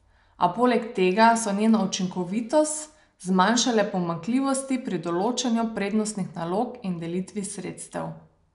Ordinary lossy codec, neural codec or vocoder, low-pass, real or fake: none; none; 10.8 kHz; real